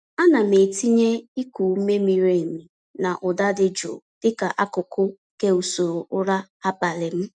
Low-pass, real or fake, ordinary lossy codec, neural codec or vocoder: 9.9 kHz; real; none; none